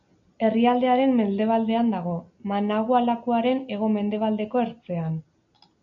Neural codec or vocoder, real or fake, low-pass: none; real; 7.2 kHz